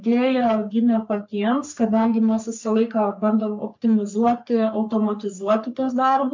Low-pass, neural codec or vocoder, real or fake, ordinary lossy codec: 7.2 kHz; codec, 44.1 kHz, 3.4 kbps, Pupu-Codec; fake; MP3, 64 kbps